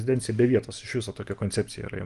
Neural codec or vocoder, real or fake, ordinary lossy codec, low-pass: none; real; Opus, 24 kbps; 10.8 kHz